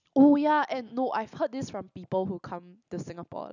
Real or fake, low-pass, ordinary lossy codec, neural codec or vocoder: real; 7.2 kHz; none; none